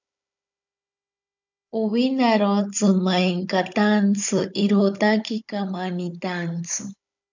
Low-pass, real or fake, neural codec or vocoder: 7.2 kHz; fake; codec, 16 kHz, 16 kbps, FunCodec, trained on Chinese and English, 50 frames a second